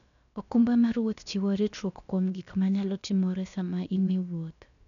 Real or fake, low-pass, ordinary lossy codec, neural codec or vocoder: fake; 7.2 kHz; none; codec, 16 kHz, about 1 kbps, DyCAST, with the encoder's durations